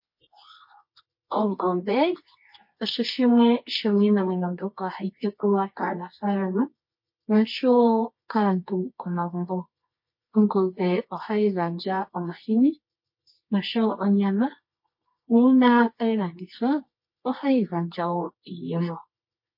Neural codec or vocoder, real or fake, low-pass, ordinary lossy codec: codec, 24 kHz, 0.9 kbps, WavTokenizer, medium music audio release; fake; 5.4 kHz; MP3, 32 kbps